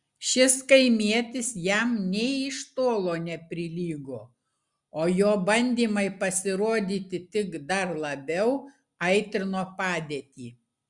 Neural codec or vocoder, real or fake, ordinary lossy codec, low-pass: none; real; Opus, 64 kbps; 10.8 kHz